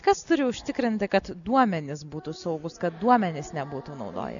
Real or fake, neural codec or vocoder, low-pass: real; none; 7.2 kHz